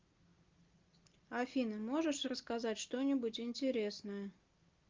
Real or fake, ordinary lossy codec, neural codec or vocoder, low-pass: real; Opus, 24 kbps; none; 7.2 kHz